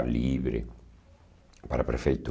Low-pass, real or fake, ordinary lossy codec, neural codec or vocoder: none; real; none; none